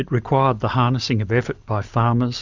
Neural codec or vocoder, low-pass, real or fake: none; 7.2 kHz; real